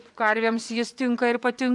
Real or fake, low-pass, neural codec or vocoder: fake; 10.8 kHz; vocoder, 24 kHz, 100 mel bands, Vocos